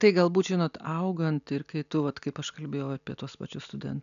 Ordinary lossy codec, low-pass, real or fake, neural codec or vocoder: MP3, 96 kbps; 7.2 kHz; real; none